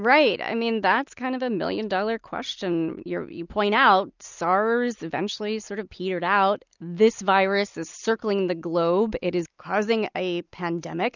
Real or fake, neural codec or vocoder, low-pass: real; none; 7.2 kHz